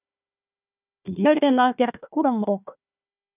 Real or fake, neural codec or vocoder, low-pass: fake; codec, 16 kHz, 1 kbps, FunCodec, trained on Chinese and English, 50 frames a second; 3.6 kHz